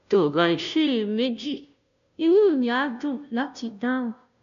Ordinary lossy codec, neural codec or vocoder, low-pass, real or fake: none; codec, 16 kHz, 0.5 kbps, FunCodec, trained on Chinese and English, 25 frames a second; 7.2 kHz; fake